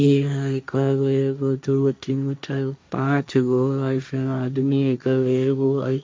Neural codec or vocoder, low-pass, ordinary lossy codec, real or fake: codec, 16 kHz, 1.1 kbps, Voila-Tokenizer; none; none; fake